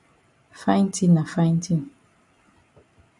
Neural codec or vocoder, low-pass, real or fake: none; 10.8 kHz; real